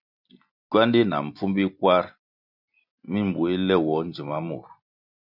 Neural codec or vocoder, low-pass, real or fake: none; 5.4 kHz; real